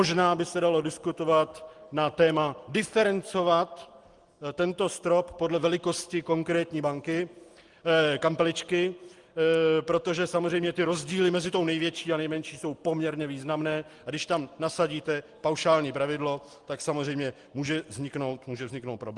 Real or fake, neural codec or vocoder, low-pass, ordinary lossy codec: real; none; 10.8 kHz; Opus, 24 kbps